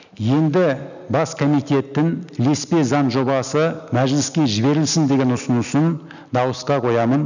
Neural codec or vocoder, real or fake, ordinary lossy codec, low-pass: none; real; none; 7.2 kHz